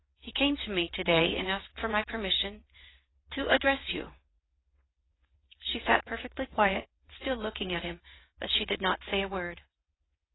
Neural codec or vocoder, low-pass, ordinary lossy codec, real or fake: vocoder, 22.05 kHz, 80 mel bands, WaveNeXt; 7.2 kHz; AAC, 16 kbps; fake